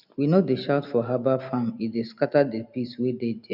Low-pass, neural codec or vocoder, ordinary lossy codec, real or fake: 5.4 kHz; none; none; real